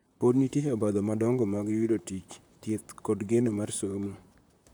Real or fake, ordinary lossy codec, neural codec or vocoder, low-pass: fake; none; vocoder, 44.1 kHz, 128 mel bands, Pupu-Vocoder; none